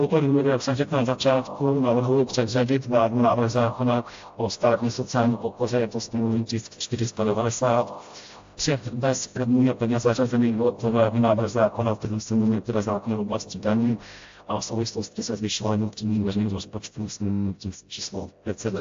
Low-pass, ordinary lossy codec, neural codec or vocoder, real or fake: 7.2 kHz; AAC, 48 kbps; codec, 16 kHz, 0.5 kbps, FreqCodec, smaller model; fake